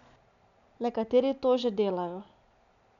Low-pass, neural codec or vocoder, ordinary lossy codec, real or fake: 7.2 kHz; none; none; real